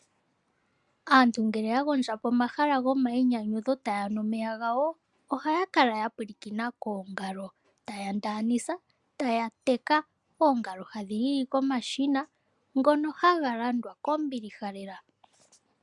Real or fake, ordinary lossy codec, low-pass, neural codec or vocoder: real; MP3, 96 kbps; 10.8 kHz; none